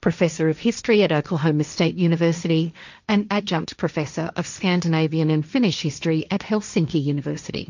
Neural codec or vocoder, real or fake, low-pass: codec, 16 kHz, 1.1 kbps, Voila-Tokenizer; fake; 7.2 kHz